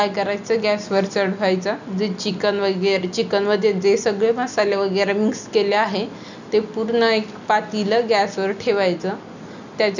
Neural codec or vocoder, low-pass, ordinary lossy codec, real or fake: none; 7.2 kHz; none; real